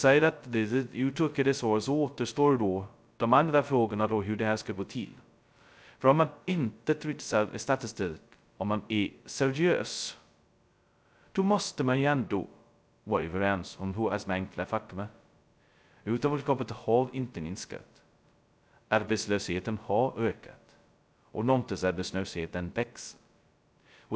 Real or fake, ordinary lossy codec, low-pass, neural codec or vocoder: fake; none; none; codec, 16 kHz, 0.2 kbps, FocalCodec